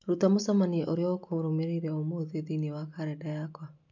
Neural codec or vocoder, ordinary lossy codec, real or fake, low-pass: none; MP3, 64 kbps; real; 7.2 kHz